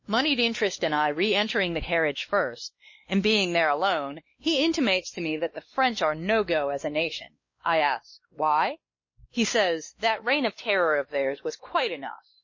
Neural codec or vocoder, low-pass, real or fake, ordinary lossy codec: codec, 16 kHz, 2 kbps, X-Codec, WavLM features, trained on Multilingual LibriSpeech; 7.2 kHz; fake; MP3, 32 kbps